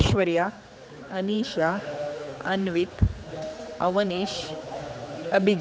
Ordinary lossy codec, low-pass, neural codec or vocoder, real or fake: none; none; codec, 16 kHz, 4 kbps, X-Codec, HuBERT features, trained on general audio; fake